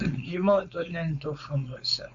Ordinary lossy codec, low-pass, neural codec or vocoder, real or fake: AAC, 64 kbps; 7.2 kHz; codec, 16 kHz, 4.8 kbps, FACodec; fake